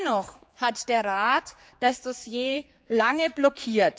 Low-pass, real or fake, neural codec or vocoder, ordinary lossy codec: none; fake; codec, 16 kHz, 4 kbps, X-Codec, HuBERT features, trained on general audio; none